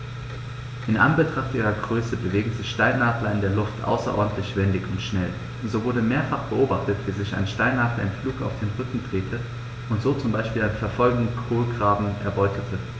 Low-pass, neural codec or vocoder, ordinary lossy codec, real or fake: none; none; none; real